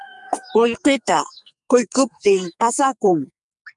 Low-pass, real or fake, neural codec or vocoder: 10.8 kHz; fake; codec, 44.1 kHz, 2.6 kbps, SNAC